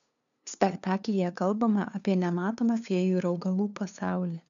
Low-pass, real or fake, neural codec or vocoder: 7.2 kHz; fake; codec, 16 kHz, 2 kbps, FunCodec, trained on LibriTTS, 25 frames a second